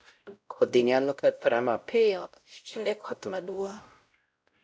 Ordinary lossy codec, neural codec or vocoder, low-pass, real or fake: none; codec, 16 kHz, 0.5 kbps, X-Codec, WavLM features, trained on Multilingual LibriSpeech; none; fake